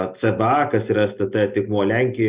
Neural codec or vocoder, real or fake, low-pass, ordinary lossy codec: none; real; 3.6 kHz; Opus, 64 kbps